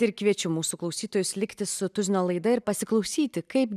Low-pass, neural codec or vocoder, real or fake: 14.4 kHz; none; real